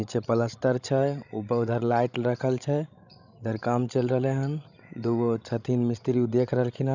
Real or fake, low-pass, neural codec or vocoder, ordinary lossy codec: fake; 7.2 kHz; codec, 16 kHz, 16 kbps, FreqCodec, larger model; none